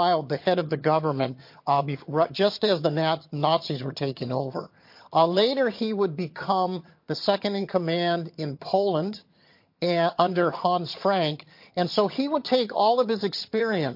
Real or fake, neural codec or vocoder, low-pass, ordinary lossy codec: fake; vocoder, 22.05 kHz, 80 mel bands, HiFi-GAN; 5.4 kHz; MP3, 32 kbps